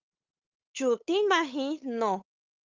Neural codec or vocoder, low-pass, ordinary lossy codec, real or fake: codec, 16 kHz, 8 kbps, FunCodec, trained on LibriTTS, 25 frames a second; 7.2 kHz; Opus, 24 kbps; fake